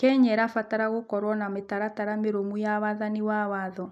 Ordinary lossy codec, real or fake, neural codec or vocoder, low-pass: none; real; none; 14.4 kHz